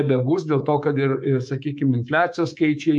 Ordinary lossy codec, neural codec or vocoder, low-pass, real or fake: MP3, 64 kbps; codec, 24 kHz, 3.1 kbps, DualCodec; 10.8 kHz; fake